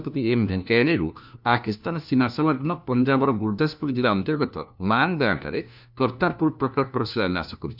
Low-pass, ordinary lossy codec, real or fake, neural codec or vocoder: 5.4 kHz; none; fake; codec, 16 kHz, 1 kbps, FunCodec, trained on LibriTTS, 50 frames a second